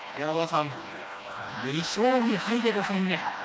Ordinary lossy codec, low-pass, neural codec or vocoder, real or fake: none; none; codec, 16 kHz, 1 kbps, FreqCodec, smaller model; fake